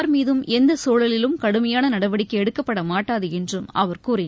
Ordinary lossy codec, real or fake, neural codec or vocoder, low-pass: none; real; none; none